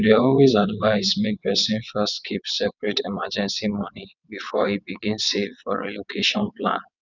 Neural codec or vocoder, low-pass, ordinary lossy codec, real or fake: vocoder, 22.05 kHz, 80 mel bands, WaveNeXt; 7.2 kHz; none; fake